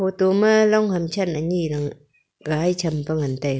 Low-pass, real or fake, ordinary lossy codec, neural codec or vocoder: none; real; none; none